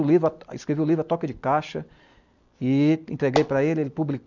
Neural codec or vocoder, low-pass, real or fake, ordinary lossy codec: none; 7.2 kHz; real; none